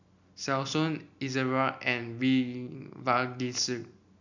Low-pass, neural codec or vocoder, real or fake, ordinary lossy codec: 7.2 kHz; none; real; none